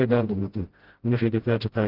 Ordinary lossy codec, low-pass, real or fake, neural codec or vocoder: Opus, 16 kbps; 5.4 kHz; fake; codec, 16 kHz, 0.5 kbps, FreqCodec, smaller model